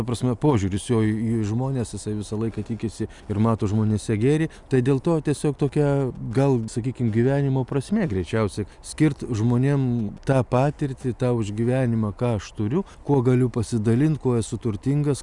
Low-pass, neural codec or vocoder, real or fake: 10.8 kHz; none; real